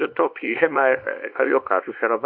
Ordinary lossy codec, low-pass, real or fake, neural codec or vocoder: AAC, 48 kbps; 5.4 kHz; fake; codec, 24 kHz, 0.9 kbps, WavTokenizer, small release